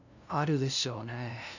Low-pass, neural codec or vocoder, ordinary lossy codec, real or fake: 7.2 kHz; codec, 16 kHz, 0.5 kbps, FunCodec, trained on LibriTTS, 25 frames a second; none; fake